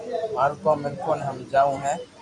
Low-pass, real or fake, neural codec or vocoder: 10.8 kHz; fake; vocoder, 44.1 kHz, 128 mel bands every 512 samples, BigVGAN v2